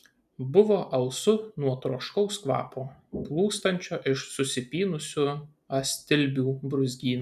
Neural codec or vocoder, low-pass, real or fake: none; 14.4 kHz; real